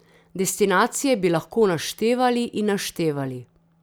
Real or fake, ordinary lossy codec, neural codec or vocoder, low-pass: real; none; none; none